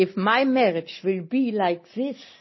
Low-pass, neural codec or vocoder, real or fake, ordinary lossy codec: 7.2 kHz; none; real; MP3, 24 kbps